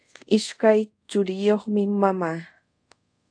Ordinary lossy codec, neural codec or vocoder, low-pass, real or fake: AAC, 64 kbps; codec, 24 kHz, 0.5 kbps, DualCodec; 9.9 kHz; fake